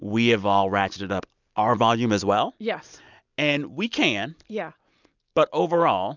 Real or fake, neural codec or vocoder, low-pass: real; none; 7.2 kHz